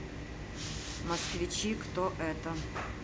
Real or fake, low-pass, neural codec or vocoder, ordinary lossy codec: real; none; none; none